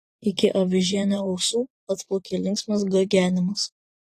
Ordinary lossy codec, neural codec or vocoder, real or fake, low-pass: AAC, 48 kbps; vocoder, 44.1 kHz, 128 mel bands every 512 samples, BigVGAN v2; fake; 14.4 kHz